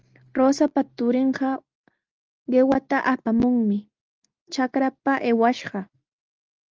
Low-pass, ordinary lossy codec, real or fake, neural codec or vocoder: 7.2 kHz; Opus, 24 kbps; real; none